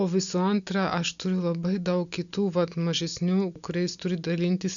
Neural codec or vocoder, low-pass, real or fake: none; 7.2 kHz; real